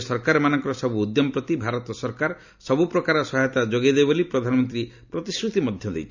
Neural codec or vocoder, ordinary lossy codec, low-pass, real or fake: none; none; 7.2 kHz; real